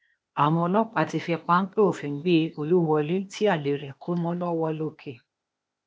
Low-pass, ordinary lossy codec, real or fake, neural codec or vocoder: none; none; fake; codec, 16 kHz, 0.8 kbps, ZipCodec